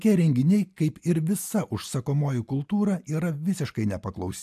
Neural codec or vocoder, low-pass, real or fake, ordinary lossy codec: none; 14.4 kHz; real; AAC, 96 kbps